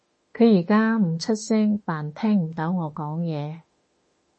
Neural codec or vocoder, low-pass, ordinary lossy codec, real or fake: autoencoder, 48 kHz, 32 numbers a frame, DAC-VAE, trained on Japanese speech; 10.8 kHz; MP3, 32 kbps; fake